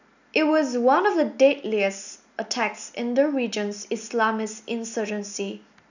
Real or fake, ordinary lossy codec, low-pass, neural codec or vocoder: real; none; 7.2 kHz; none